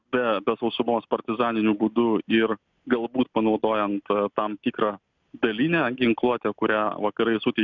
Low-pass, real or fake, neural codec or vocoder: 7.2 kHz; real; none